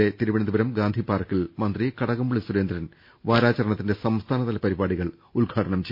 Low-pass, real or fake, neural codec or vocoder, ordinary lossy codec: 5.4 kHz; real; none; MP3, 32 kbps